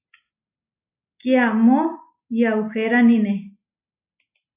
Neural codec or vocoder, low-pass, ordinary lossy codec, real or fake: none; 3.6 kHz; AAC, 32 kbps; real